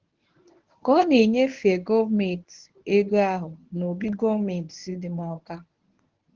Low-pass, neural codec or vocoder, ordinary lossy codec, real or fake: 7.2 kHz; codec, 24 kHz, 0.9 kbps, WavTokenizer, medium speech release version 1; Opus, 16 kbps; fake